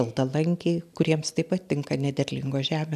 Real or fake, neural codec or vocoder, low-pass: real; none; 14.4 kHz